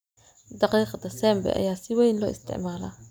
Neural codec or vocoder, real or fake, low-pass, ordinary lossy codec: none; real; none; none